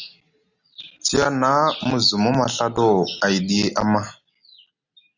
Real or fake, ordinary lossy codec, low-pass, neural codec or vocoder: real; Opus, 64 kbps; 7.2 kHz; none